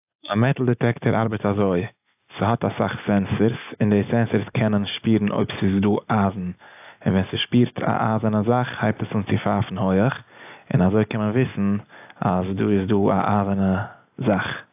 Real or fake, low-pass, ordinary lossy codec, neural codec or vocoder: real; 3.6 kHz; AAC, 32 kbps; none